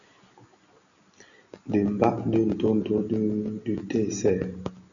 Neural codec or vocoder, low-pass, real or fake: none; 7.2 kHz; real